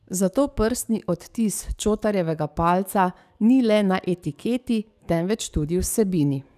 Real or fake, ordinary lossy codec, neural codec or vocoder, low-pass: fake; none; codec, 44.1 kHz, 7.8 kbps, DAC; 14.4 kHz